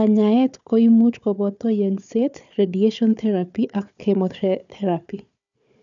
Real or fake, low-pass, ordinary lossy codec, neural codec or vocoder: fake; 7.2 kHz; none; codec, 16 kHz, 4 kbps, FunCodec, trained on Chinese and English, 50 frames a second